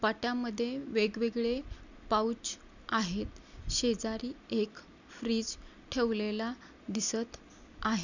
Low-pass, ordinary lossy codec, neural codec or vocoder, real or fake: 7.2 kHz; none; none; real